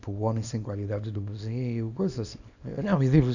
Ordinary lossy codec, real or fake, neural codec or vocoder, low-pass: none; fake; codec, 24 kHz, 0.9 kbps, WavTokenizer, small release; 7.2 kHz